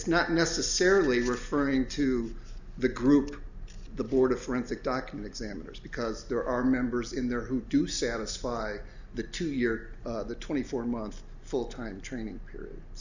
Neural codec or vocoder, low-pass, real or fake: none; 7.2 kHz; real